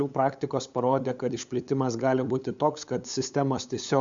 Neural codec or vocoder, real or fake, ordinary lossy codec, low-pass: codec, 16 kHz, 8 kbps, FunCodec, trained on LibriTTS, 25 frames a second; fake; Opus, 64 kbps; 7.2 kHz